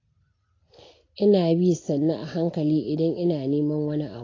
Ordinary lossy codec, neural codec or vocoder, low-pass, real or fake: AAC, 32 kbps; none; 7.2 kHz; real